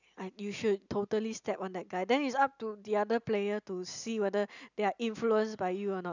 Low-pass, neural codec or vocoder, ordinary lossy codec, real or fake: 7.2 kHz; none; none; real